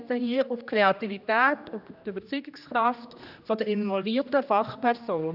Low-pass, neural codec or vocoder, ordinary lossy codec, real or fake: 5.4 kHz; codec, 16 kHz, 1 kbps, X-Codec, HuBERT features, trained on general audio; none; fake